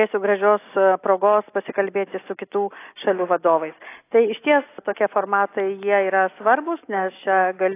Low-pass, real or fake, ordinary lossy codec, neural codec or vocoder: 3.6 kHz; real; AAC, 24 kbps; none